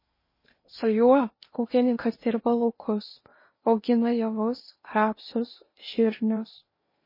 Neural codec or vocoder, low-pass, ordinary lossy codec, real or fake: codec, 16 kHz in and 24 kHz out, 0.8 kbps, FocalCodec, streaming, 65536 codes; 5.4 kHz; MP3, 24 kbps; fake